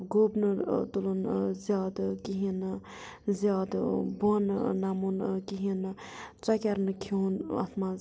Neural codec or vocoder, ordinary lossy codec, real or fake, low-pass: none; none; real; none